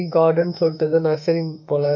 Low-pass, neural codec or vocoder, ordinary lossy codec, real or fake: 7.2 kHz; autoencoder, 48 kHz, 32 numbers a frame, DAC-VAE, trained on Japanese speech; none; fake